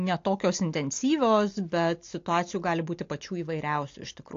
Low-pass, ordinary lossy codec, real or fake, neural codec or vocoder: 7.2 kHz; AAC, 48 kbps; real; none